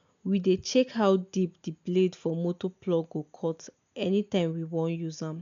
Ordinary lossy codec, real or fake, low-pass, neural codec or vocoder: MP3, 96 kbps; real; 7.2 kHz; none